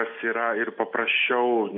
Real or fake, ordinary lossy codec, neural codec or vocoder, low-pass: real; MP3, 32 kbps; none; 5.4 kHz